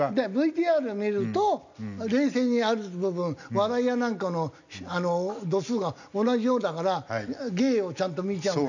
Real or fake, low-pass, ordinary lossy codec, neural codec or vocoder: real; 7.2 kHz; none; none